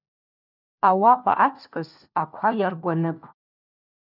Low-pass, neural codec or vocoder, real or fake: 5.4 kHz; codec, 16 kHz, 1 kbps, FunCodec, trained on LibriTTS, 50 frames a second; fake